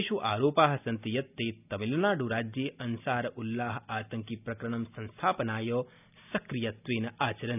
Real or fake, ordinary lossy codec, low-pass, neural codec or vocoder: real; none; 3.6 kHz; none